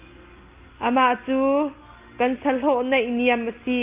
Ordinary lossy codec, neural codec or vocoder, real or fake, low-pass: Opus, 24 kbps; none; real; 3.6 kHz